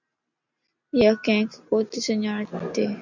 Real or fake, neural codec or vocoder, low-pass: real; none; 7.2 kHz